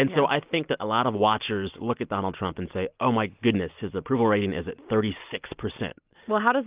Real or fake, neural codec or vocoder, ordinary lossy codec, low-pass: real; none; Opus, 32 kbps; 3.6 kHz